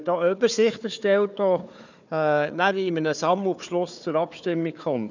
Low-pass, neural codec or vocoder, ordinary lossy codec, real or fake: 7.2 kHz; codec, 16 kHz, 4 kbps, FunCodec, trained on Chinese and English, 50 frames a second; none; fake